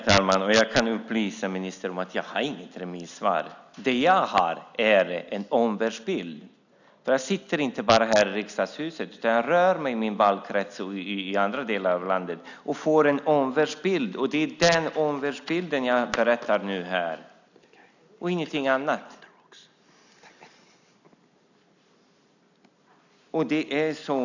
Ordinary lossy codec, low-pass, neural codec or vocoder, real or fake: none; 7.2 kHz; none; real